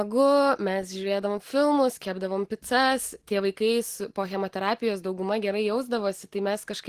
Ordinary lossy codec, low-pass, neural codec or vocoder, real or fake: Opus, 16 kbps; 14.4 kHz; none; real